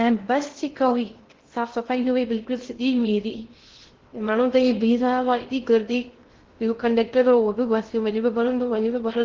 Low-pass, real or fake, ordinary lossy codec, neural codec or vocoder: 7.2 kHz; fake; Opus, 16 kbps; codec, 16 kHz in and 24 kHz out, 0.6 kbps, FocalCodec, streaming, 2048 codes